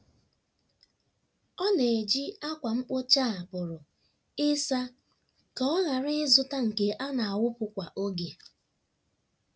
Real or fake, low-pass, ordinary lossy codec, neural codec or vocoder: real; none; none; none